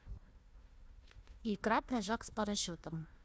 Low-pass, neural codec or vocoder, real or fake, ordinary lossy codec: none; codec, 16 kHz, 1 kbps, FunCodec, trained on Chinese and English, 50 frames a second; fake; none